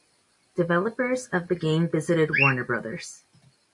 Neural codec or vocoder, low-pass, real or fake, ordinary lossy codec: none; 10.8 kHz; real; AAC, 64 kbps